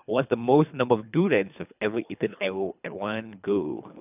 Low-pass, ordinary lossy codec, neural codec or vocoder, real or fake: 3.6 kHz; none; codec, 24 kHz, 3 kbps, HILCodec; fake